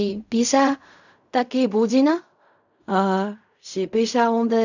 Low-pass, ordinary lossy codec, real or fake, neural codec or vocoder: 7.2 kHz; none; fake; codec, 16 kHz in and 24 kHz out, 0.4 kbps, LongCat-Audio-Codec, fine tuned four codebook decoder